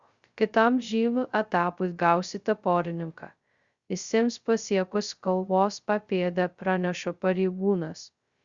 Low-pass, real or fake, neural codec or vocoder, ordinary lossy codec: 7.2 kHz; fake; codec, 16 kHz, 0.2 kbps, FocalCodec; Opus, 64 kbps